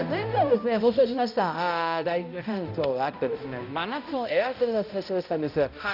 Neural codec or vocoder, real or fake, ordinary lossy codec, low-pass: codec, 16 kHz, 0.5 kbps, X-Codec, HuBERT features, trained on balanced general audio; fake; none; 5.4 kHz